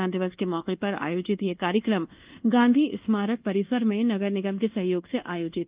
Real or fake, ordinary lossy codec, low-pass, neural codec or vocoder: fake; Opus, 32 kbps; 3.6 kHz; codec, 24 kHz, 1.2 kbps, DualCodec